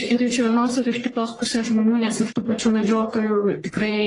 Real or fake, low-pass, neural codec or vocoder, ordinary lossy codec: fake; 10.8 kHz; codec, 44.1 kHz, 1.7 kbps, Pupu-Codec; AAC, 32 kbps